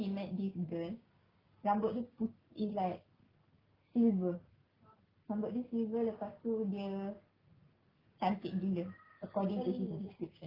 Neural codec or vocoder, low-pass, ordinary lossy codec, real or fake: none; 5.4 kHz; Opus, 32 kbps; real